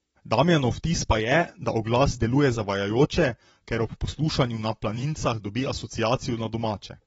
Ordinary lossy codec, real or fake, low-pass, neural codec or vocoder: AAC, 24 kbps; fake; 19.8 kHz; vocoder, 44.1 kHz, 128 mel bands, Pupu-Vocoder